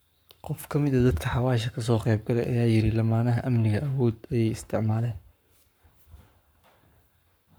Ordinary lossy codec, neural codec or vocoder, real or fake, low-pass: none; codec, 44.1 kHz, 7.8 kbps, Pupu-Codec; fake; none